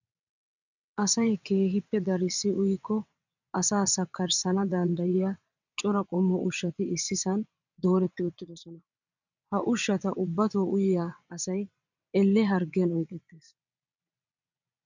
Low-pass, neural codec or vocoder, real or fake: 7.2 kHz; vocoder, 22.05 kHz, 80 mel bands, WaveNeXt; fake